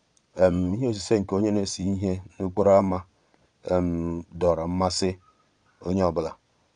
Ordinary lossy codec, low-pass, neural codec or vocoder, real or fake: none; 9.9 kHz; vocoder, 22.05 kHz, 80 mel bands, Vocos; fake